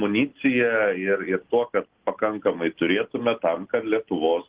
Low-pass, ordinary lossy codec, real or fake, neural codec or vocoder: 3.6 kHz; Opus, 16 kbps; real; none